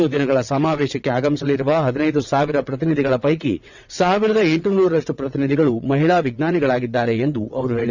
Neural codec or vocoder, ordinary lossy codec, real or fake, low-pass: vocoder, 22.05 kHz, 80 mel bands, WaveNeXt; none; fake; 7.2 kHz